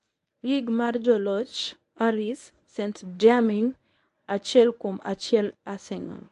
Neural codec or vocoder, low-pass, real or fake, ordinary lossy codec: codec, 24 kHz, 0.9 kbps, WavTokenizer, medium speech release version 1; 10.8 kHz; fake; AAC, 64 kbps